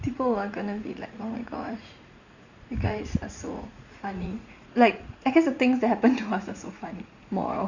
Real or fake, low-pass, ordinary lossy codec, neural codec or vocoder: fake; 7.2 kHz; Opus, 64 kbps; vocoder, 44.1 kHz, 80 mel bands, Vocos